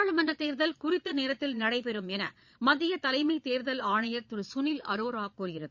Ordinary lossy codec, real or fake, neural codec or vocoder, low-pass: Opus, 64 kbps; fake; vocoder, 22.05 kHz, 80 mel bands, Vocos; 7.2 kHz